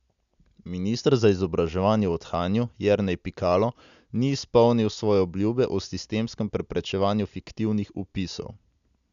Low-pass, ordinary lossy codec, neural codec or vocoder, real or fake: 7.2 kHz; MP3, 96 kbps; none; real